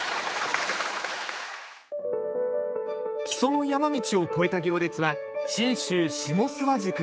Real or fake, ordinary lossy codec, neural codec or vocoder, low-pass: fake; none; codec, 16 kHz, 4 kbps, X-Codec, HuBERT features, trained on general audio; none